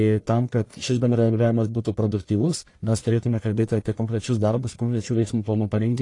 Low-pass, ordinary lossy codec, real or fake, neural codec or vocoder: 10.8 kHz; AAC, 48 kbps; fake; codec, 44.1 kHz, 1.7 kbps, Pupu-Codec